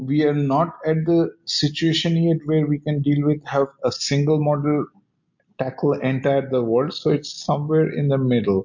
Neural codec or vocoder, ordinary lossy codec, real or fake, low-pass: none; MP3, 48 kbps; real; 7.2 kHz